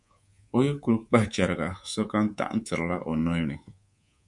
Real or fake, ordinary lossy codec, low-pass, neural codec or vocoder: fake; MP3, 64 kbps; 10.8 kHz; codec, 24 kHz, 3.1 kbps, DualCodec